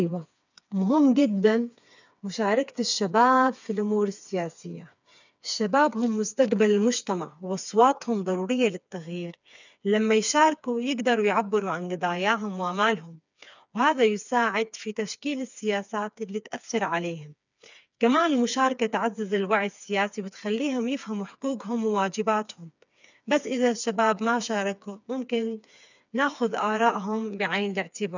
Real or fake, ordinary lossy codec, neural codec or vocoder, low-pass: fake; none; codec, 16 kHz, 4 kbps, FreqCodec, smaller model; 7.2 kHz